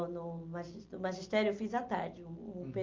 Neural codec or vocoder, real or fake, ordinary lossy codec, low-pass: none; real; Opus, 24 kbps; 7.2 kHz